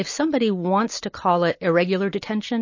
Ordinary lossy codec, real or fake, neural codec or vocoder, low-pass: MP3, 32 kbps; real; none; 7.2 kHz